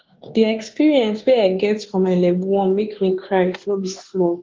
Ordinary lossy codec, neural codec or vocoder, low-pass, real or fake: Opus, 16 kbps; codec, 24 kHz, 1.2 kbps, DualCodec; 7.2 kHz; fake